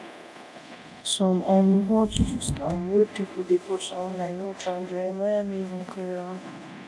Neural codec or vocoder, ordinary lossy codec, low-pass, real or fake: codec, 24 kHz, 0.9 kbps, DualCodec; none; none; fake